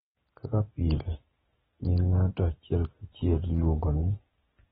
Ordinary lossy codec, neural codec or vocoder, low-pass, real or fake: AAC, 16 kbps; none; 7.2 kHz; real